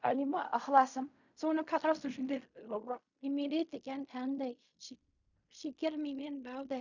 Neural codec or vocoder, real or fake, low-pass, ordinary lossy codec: codec, 16 kHz in and 24 kHz out, 0.4 kbps, LongCat-Audio-Codec, fine tuned four codebook decoder; fake; 7.2 kHz; none